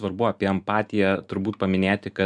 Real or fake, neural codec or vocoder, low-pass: real; none; 10.8 kHz